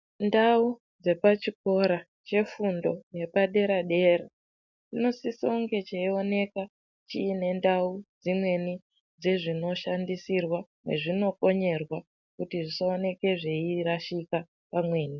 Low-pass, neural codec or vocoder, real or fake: 7.2 kHz; none; real